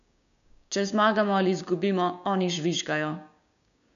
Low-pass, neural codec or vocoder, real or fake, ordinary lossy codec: 7.2 kHz; codec, 16 kHz, 6 kbps, DAC; fake; none